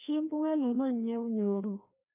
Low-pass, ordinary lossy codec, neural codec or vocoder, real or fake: 3.6 kHz; none; codec, 16 kHz, 1 kbps, FreqCodec, larger model; fake